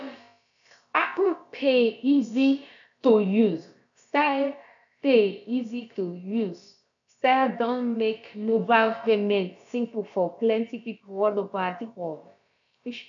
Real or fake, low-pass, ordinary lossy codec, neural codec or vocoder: fake; 7.2 kHz; none; codec, 16 kHz, about 1 kbps, DyCAST, with the encoder's durations